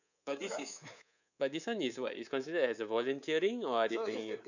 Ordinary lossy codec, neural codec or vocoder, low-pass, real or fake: none; codec, 24 kHz, 3.1 kbps, DualCodec; 7.2 kHz; fake